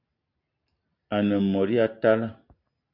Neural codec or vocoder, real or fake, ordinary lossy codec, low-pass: none; real; Opus, 64 kbps; 5.4 kHz